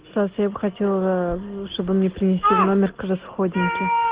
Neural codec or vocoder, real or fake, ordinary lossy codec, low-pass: none; real; Opus, 16 kbps; 3.6 kHz